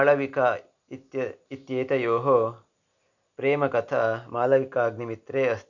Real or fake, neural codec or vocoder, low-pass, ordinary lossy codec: real; none; 7.2 kHz; none